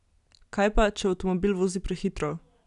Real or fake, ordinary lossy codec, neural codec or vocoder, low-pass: real; none; none; 10.8 kHz